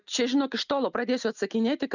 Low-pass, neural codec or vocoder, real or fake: 7.2 kHz; none; real